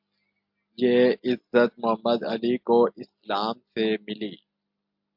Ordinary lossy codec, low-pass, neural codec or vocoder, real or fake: MP3, 48 kbps; 5.4 kHz; none; real